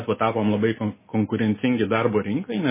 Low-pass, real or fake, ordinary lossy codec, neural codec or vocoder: 3.6 kHz; real; MP3, 16 kbps; none